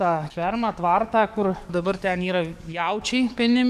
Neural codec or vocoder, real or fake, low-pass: codec, 44.1 kHz, 7.8 kbps, DAC; fake; 14.4 kHz